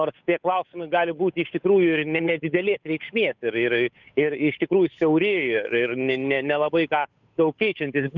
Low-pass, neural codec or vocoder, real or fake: 7.2 kHz; codec, 16 kHz, 8 kbps, FunCodec, trained on Chinese and English, 25 frames a second; fake